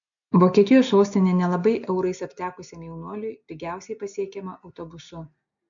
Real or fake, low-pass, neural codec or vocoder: real; 7.2 kHz; none